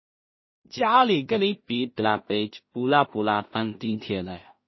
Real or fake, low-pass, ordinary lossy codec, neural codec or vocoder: fake; 7.2 kHz; MP3, 24 kbps; codec, 16 kHz in and 24 kHz out, 0.4 kbps, LongCat-Audio-Codec, two codebook decoder